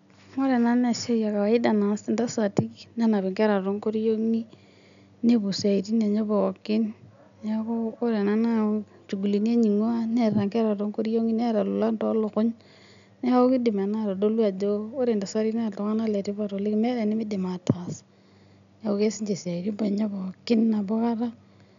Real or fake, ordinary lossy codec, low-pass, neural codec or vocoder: real; none; 7.2 kHz; none